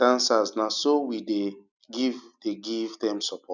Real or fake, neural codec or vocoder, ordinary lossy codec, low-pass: real; none; none; 7.2 kHz